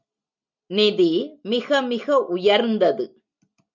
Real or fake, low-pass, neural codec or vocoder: real; 7.2 kHz; none